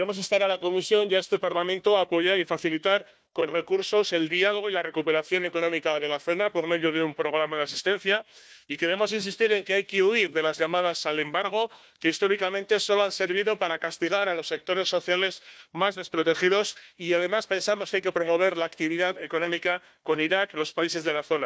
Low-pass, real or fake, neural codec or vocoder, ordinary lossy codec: none; fake; codec, 16 kHz, 1 kbps, FunCodec, trained on Chinese and English, 50 frames a second; none